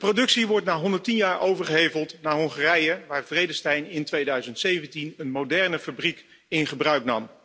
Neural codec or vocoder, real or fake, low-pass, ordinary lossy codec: none; real; none; none